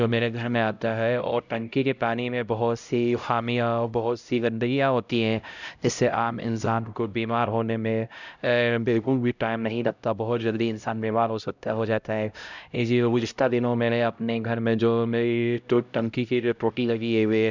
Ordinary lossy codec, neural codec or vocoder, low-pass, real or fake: none; codec, 16 kHz, 0.5 kbps, X-Codec, HuBERT features, trained on LibriSpeech; 7.2 kHz; fake